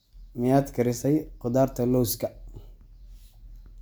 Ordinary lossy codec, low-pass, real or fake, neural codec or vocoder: none; none; real; none